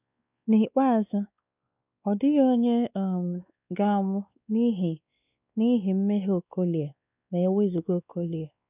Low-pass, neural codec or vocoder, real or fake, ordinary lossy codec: 3.6 kHz; codec, 16 kHz, 2 kbps, X-Codec, WavLM features, trained on Multilingual LibriSpeech; fake; none